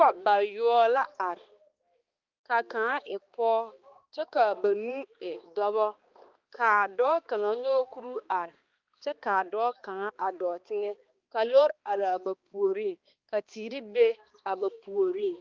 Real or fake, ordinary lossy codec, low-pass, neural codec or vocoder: fake; Opus, 32 kbps; 7.2 kHz; codec, 16 kHz, 2 kbps, X-Codec, HuBERT features, trained on balanced general audio